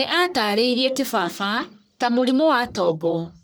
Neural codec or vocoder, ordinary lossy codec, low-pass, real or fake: codec, 44.1 kHz, 1.7 kbps, Pupu-Codec; none; none; fake